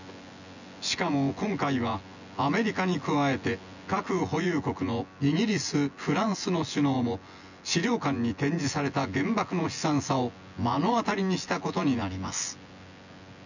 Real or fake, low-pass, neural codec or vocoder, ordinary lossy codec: fake; 7.2 kHz; vocoder, 24 kHz, 100 mel bands, Vocos; none